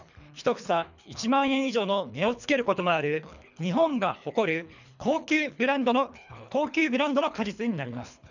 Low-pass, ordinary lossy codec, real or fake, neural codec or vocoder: 7.2 kHz; none; fake; codec, 24 kHz, 3 kbps, HILCodec